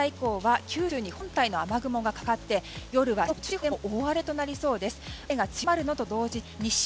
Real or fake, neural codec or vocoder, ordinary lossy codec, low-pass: real; none; none; none